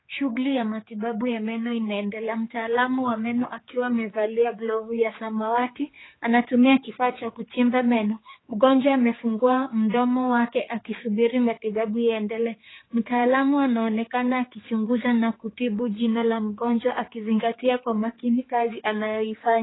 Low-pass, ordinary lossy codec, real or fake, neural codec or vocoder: 7.2 kHz; AAC, 16 kbps; fake; codec, 16 kHz, 4 kbps, X-Codec, HuBERT features, trained on general audio